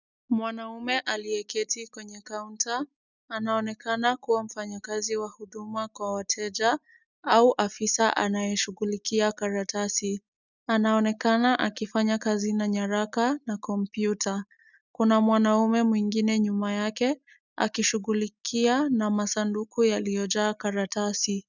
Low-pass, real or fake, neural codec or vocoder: 7.2 kHz; real; none